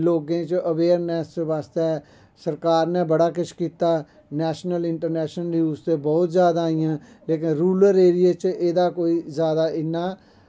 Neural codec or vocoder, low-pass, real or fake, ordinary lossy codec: none; none; real; none